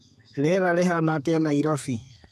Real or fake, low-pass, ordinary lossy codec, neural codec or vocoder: fake; 14.4 kHz; none; codec, 32 kHz, 1.9 kbps, SNAC